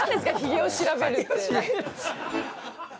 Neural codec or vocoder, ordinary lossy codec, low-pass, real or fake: none; none; none; real